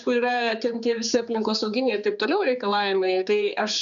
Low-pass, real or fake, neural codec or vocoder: 7.2 kHz; fake; codec, 16 kHz, 4 kbps, X-Codec, HuBERT features, trained on general audio